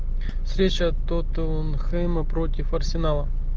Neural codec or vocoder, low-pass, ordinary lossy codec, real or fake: none; 7.2 kHz; Opus, 24 kbps; real